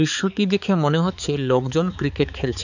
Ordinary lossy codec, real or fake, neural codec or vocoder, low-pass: none; fake; codec, 16 kHz, 4 kbps, X-Codec, HuBERT features, trained on balanced general audio; 7.2 kHz